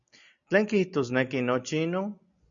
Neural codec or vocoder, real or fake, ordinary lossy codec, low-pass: none; real; MP3, 96 kbps; 7.2 kHz